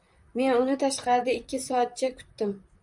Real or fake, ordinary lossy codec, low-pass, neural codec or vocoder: fake; MP3, 96 kbps; 10.8 kHz; vocoder, 44.1 kHz, 128 mel bands, Pupu-Vocoder